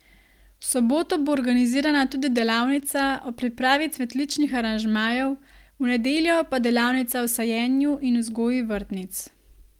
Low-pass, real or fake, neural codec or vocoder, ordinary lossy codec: 19.8 kHz; real; none; Opus, 24 kbps